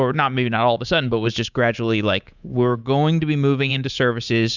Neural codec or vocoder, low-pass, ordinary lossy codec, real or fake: codec, 24 kHz, 3.1 kbps, DualCodec; 7.2 kHz; Opus, 64 kbps; fake